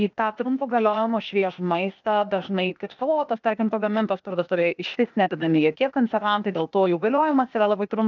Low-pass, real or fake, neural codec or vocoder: 7.2 kHz; fake; codec, 16 kHz, 0.8 kbps, ZipCodec